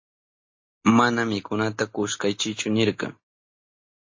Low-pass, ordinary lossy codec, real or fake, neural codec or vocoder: 7.2 kHz; MP3, 32 kbps; real; none